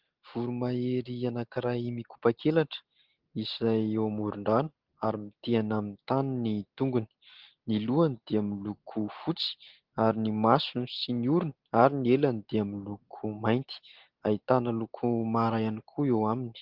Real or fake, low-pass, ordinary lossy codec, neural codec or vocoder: real; 5.4 kHz; Opus, 16 kbps; none